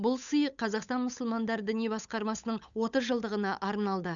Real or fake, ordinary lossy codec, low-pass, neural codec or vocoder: fake; none; 7.2 kHz; codec, 16 kHz, 8 kbps, FunCodec, trained on Chinese and English, 25 frames a second